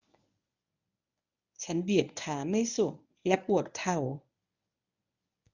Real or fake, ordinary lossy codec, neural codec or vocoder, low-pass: fake; none; codec, 24 kHz, 0.9 kbps, WavTokenizer, medium speech release version 1; 7.2 kHz